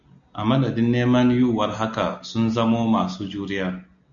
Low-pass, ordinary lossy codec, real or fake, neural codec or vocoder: 7.2 kHz; MP3, 96 kbps; real; none